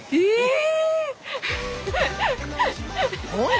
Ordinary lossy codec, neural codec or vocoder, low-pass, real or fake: none; none; none; real